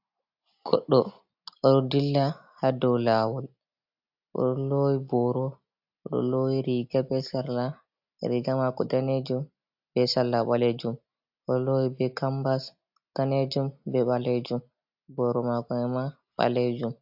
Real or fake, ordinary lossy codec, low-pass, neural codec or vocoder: real; AAC, 48 kbps; 5.4 kHz; none